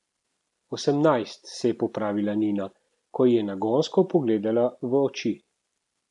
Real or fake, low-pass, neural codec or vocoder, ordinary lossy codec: real; 10.8 kHz; none; none